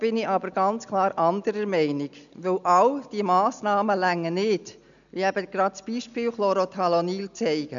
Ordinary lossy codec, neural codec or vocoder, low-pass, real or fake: none; none; 7.2 kHz; real